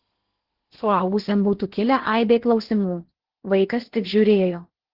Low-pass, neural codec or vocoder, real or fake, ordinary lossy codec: 5.4 kHz; codec, 16 kHz in and 24 kHz out, 0.8 kbps, FocalCodec, streaming, 65536 codes; fake; Opus, 16 kbps